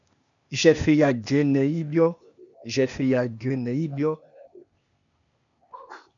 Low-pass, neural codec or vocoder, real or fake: 7.2 kHz; codec, 16 kHz, 0.8 kbps, ZipCodec; fake